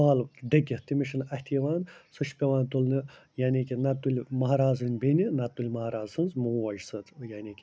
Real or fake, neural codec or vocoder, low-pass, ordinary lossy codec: real; none; none; none